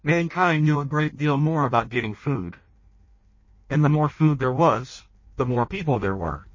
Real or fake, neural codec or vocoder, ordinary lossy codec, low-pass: fake; codec, 16 kHz in and 24 kHz out, 0.6 kbps, FireRedTTS-2 codec; MP3, 32 kbps; 7.2 kHz